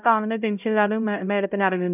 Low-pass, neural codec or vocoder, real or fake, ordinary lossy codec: 3.6 kHz; codec, 16 kHz, 0.5 kbps, X-Codec, HuBERT features, trained on LibriSpeech; fake; none